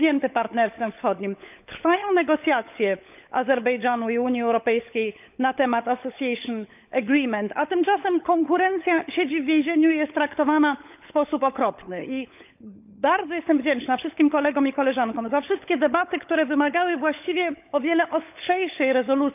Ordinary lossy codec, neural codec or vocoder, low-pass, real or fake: none; codec, 16 kHz, 16 kbps, FunCodec, trained on LibriTTS, 50 frames a second; 3.6 kHz; fake